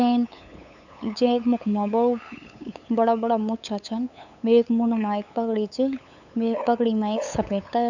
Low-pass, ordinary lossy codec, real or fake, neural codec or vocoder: 7.2 kHz; none; fake; codec, 16 kHz, 8 kbps, FunCodec, trained on LibriTTS, 25 frames a second